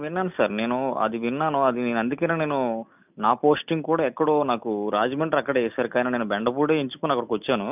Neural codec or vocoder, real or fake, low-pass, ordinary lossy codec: none; real; 3.6 kHz; none